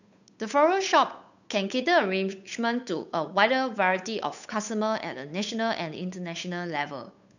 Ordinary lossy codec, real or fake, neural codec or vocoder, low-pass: none; fake; codec, 16 kHz, 8 kbps, FunCodec, trained on Chinese and English, 25 frames a second; 7.2 kHz